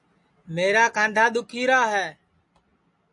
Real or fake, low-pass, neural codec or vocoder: real; 10.8 kHz; none